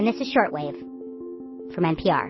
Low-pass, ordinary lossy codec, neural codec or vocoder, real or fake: 7.2 kHz; MP3, 24 kbps; none; real